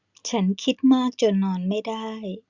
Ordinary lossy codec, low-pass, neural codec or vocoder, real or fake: Opus, 64 kbps; 7.2 kHz; none; real